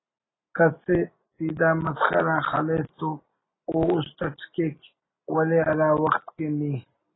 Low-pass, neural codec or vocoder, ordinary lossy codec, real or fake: 7.2 kHz; none; AAC, 16 kbps; real